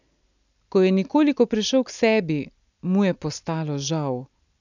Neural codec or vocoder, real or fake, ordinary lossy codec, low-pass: none; real; none; 7.2 kHz